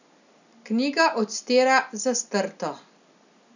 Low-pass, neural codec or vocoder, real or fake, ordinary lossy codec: 7.2 kHz; none; real; none